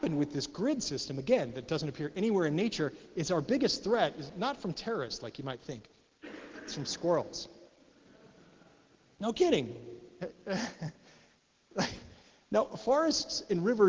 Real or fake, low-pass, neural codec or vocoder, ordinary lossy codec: real; 7.2 kHz; none; Opus, 16 kbps